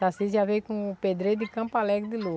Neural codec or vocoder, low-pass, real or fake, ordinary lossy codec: none; none; real; none